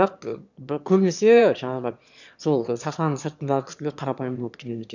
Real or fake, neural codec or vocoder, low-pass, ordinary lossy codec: fake; autoencoder, 22.05 kHz, a latent of 192 numbers a frame, VITS, trained on one speaker; 7.2 kHz; none